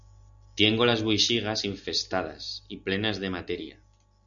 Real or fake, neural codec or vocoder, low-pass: real; none; 7.2 kHz